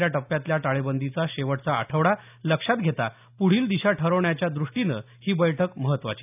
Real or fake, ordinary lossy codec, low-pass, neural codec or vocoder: real; none; 3.6 kHz; none